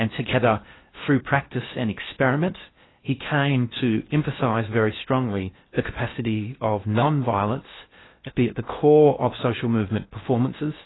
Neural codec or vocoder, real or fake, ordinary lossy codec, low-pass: codec, 16 kHz in and 24 kHz out, 0.6 kbps, FocalCodec, streaming, 4096 codes; fake; AAC, 16 kbps; 7.2 kHz